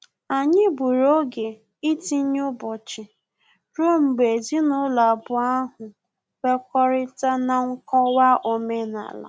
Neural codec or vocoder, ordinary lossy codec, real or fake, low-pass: none; none; real; none